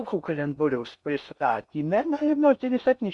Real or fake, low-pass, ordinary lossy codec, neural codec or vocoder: fake; 10.8 kHz; Opus, 64 kbps; codec, 16 kHz in and 24 kHz out, 0.6 kbps, FocalCodec, streaming, 2048 codes